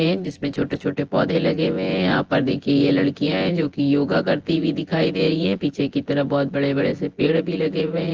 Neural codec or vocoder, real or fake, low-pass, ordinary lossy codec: vocoder, 24 kHz, 100 mel bands, Vocos; fake; 7.2 kHz; Opus, 16 kbps